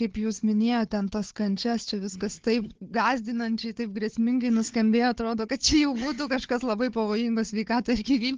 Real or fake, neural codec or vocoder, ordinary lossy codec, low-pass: fake; codec, 16 kHz, 4 kbps, FunCodec, trained on Chinese and English, 50 frames a second; Opus, 16 kbps; 7.2 kHz